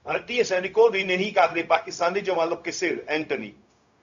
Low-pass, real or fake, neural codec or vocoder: 7.2 kHz; fake; codec, 16 kHz, 0.4 kbps, LongCat-Audio-Codec